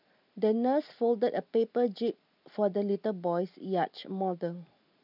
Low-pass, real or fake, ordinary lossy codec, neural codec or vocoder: 5.4 kHz; real; none; none